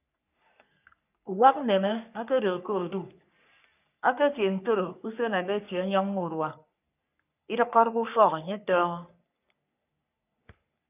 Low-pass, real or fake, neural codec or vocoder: 3.6 kHz; fake; codec, 44.1 kHz, 3.4 kbps, Pupu-Codec